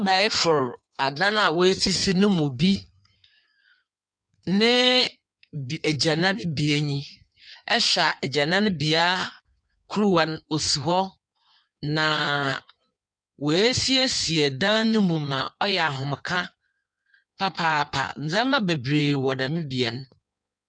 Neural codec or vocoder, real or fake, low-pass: codec, 16 kHz in and 24 kHz out, 1.1 kbps, FireRedTTS-2 codec; fake; 9.9 kHz